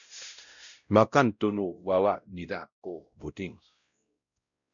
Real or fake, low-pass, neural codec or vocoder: fake; 7.2 kHz; codec, 16 kHz, 0.5 kbps, X-Codec, WavLM features, trained on Multilingual LibriSpeech